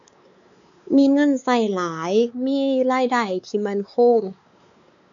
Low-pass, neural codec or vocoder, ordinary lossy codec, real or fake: 7.2 kHz; codec, 16 kHz, 4 kbps, X-Codec, WavLM features, trained on Multilingual LibriSpeech; none; fake